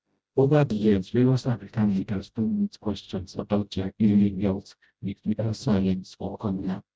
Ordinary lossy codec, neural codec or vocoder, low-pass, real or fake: none; codec, 16 kHz, 0.5 kbps, FreqCodec, smaller model; none; fake